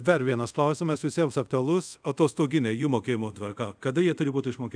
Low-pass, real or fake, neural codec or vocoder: 9.9 kHz; fake; codec, 24 kHz, 0.5 kbps, DualCodec